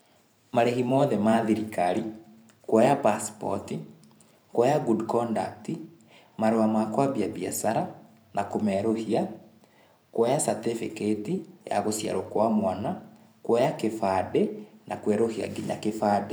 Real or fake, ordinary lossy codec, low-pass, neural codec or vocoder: fake; none; none; vocoder, 44.1 kHz, 128 mel bands every 512 samples, BigVGAN v2